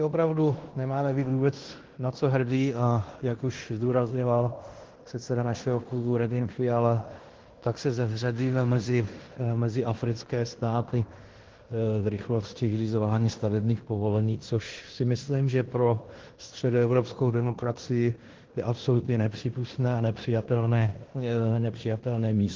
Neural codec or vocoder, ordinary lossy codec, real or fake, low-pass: codec, 16 kHz in and 24 kHz out, 0.9 kbps, LongCat-Audio-Codec, fine tuned four codebook decoder; Opus, 16 kbps; fake; 7.2 kHz